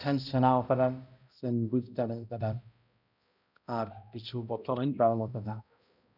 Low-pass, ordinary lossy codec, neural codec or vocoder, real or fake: 5.4 kHz; none; codec, 16 kHz, 0.5 kbps, X-Codec, HuBERT features, trained on balanced general audio; fake